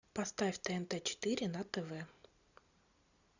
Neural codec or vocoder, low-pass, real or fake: none; 7.2 kHz; real